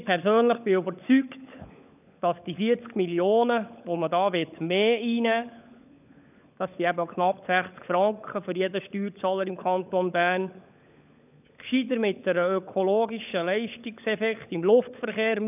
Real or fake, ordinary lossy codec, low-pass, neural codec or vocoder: fake; none; 3.6 kHz; codec, 16 kHz, 16 kbps, FunCodec, trained on LibriTTS, 50 frames a second